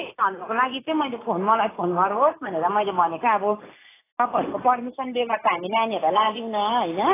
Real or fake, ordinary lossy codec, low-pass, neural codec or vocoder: real; AAC, 16 kbps; 3.6 kHz; none